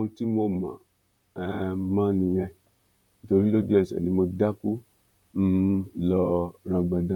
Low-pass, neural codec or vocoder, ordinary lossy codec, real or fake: 19.8 kHz; vocoder, 44.1 kHz, 128 mel bands, Pupu-Vocoder; none; fake